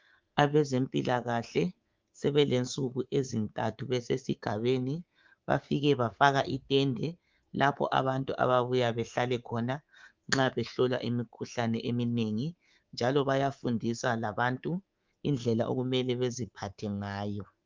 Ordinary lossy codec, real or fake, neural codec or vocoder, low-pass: Opus, 24 kbps; fake; codec, 24 kHz, 3.1 kbps, DualCodec; 7.2 kHz